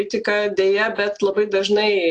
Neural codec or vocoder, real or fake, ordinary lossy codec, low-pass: none; real; AAC, 48 kbps; 10.8 kHz